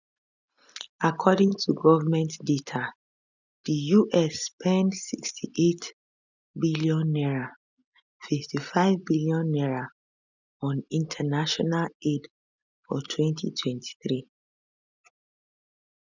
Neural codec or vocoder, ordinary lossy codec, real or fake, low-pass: none; none; real; 7.2 kHz